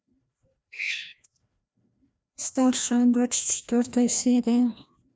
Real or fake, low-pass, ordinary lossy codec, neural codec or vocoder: fake; none; none; codec, 16 kHz, 1 kbps, FreqCodec, larger model